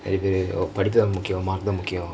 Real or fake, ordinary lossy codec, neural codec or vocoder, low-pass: real; none; none; none